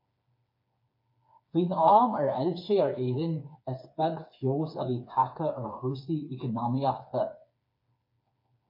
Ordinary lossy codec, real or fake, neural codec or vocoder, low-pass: MP3, 32 kbps; fake; codec, 16 kHz, 4 kbps, FreqCodec, smaller model; 5.4 kHz